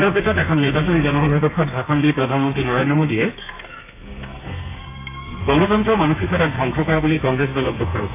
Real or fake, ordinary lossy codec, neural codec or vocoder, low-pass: fake; AAC, 24 kbps; codec, 32 kHz, 1.9 kbps, SNAC; 3.6 kHz